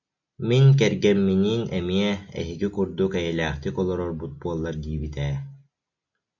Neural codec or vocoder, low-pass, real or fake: none; 7.2 kHz; real